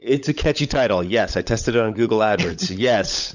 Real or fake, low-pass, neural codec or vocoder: fake; 7.2 kHz; codec, 16 kHz, 16 kbps, FunCodec, trained on LibriTTS, 50 frames a second